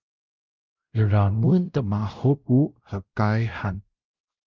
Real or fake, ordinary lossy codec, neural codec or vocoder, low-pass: fake; Opus, 24 kbps; codec, 16 kHz, 0.5 kbps, X-Codec, WavLM features, trained on Multilingual LibriSpeech; 7.2 kHz